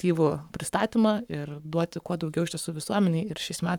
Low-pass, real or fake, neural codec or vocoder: 19.8 kHz; fake; codec, 44.1 kHz, 7.8 kbps, Pupu-Codec